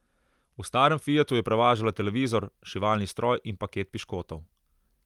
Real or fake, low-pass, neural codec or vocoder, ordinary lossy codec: real; 19.8 kHz; none; Opus, 32 kbps